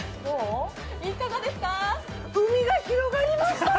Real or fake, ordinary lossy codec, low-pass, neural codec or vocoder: real; none; none; none